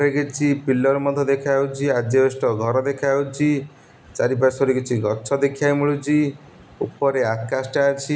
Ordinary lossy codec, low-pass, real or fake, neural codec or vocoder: none; none; real; none